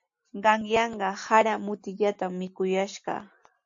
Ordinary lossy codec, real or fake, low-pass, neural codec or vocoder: AAC, 64 kbps; real; 7.2 kHz; none